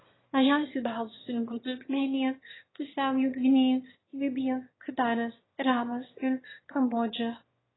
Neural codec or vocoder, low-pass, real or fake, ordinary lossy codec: autoencoder, 22.05 kHz, a latent of 192 numbers a frame, VITS, trained on one speaker; 7.2 kHz; fake; AAC, 16 kbps